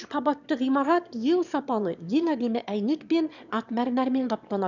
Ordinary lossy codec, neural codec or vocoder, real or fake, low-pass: none; autoencoder, 22.05 kHz, a latent of 192 numbers a frame, VITS, trained on one speaker; fake; 7.2 kHz